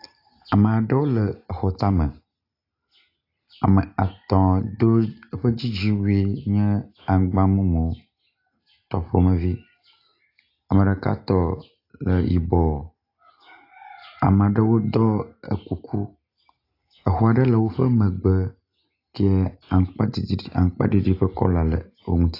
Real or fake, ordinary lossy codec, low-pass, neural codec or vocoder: real; AAC, 32 kbps; 5.4 kHz; none